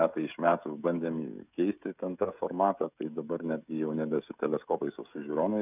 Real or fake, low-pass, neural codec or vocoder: fake; 3.6 kHz; codec, 16 kHz, 16 kbps, FreqCodec, smaller model